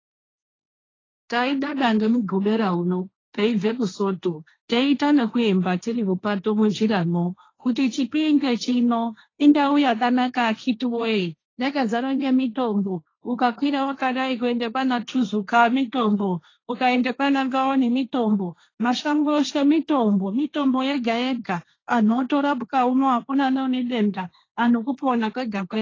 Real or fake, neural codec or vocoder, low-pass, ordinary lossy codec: fake; codec, 16 kHz, 1.1 kbps, Voila-Tokenizer; 7.2 kHz; AAC, 32 kbps